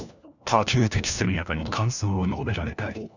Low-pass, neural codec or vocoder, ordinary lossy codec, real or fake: 7.2 kHz; codec, 16 kHz, 1 kbps, FunCodec, trained on LibriTTS, 50 frames a second; none; fake